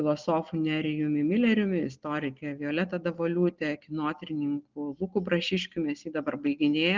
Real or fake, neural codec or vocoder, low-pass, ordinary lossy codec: real; none; 7.2 kHz; Opus, 32 kbps